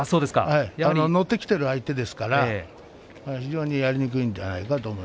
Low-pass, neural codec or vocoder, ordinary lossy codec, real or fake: none; none; none; real